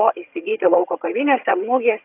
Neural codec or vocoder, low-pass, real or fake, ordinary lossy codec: vocoder, 22.05 kHz, 80 mel bands, HiFi-GAN; 3.6 kHz; fake; AAC, 32 kbps